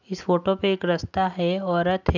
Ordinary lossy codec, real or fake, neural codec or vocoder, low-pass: none; real; none; 7.2 kHz